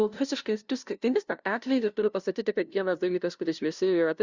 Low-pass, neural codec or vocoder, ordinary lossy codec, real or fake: 7.2 kHz; codec, 16 kHz, 0.5 kbps, FunCodec, trained on LibriTTS, 25 frames a second; Opus, 64 kbps; fake